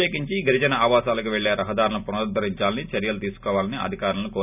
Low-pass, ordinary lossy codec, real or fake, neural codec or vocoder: 3.6 kHz; none; real; none